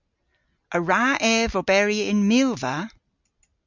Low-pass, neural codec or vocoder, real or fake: 7.2 kHz; none; real